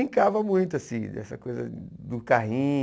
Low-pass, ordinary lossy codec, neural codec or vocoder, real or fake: none; none; none; real